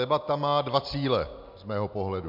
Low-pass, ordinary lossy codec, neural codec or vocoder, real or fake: 5.4 kHz; MP3, 48 kbps; none; real